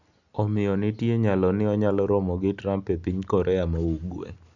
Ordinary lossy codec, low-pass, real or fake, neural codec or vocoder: none; 7.2 kHz; real; none